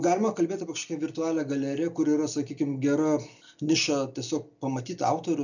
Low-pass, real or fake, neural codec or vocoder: 7.2 kHz; real; none